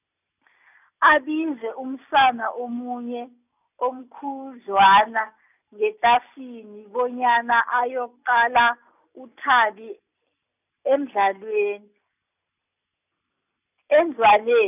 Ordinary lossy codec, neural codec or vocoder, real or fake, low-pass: none; none; real; 3.6 kHz